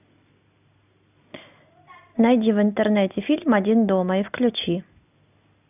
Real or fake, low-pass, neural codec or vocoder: real; 3.6 kHz; none